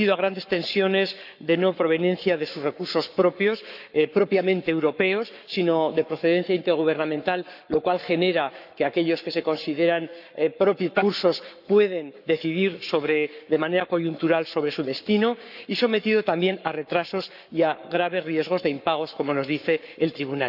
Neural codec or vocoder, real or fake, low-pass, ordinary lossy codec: codec, 44.1 kHz, 7.8 kbps, Pupu-Codec; fake; 5.4 kHz; none